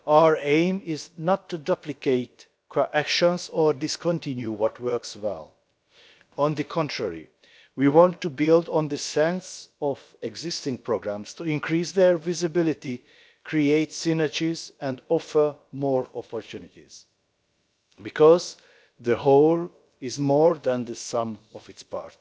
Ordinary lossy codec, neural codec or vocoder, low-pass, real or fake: none; codec, 16 kHz, about 1 kbps, DyCAST, with the encoder's durations; none; fake